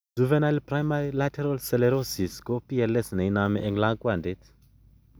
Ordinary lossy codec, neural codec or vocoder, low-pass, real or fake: none; none; none; real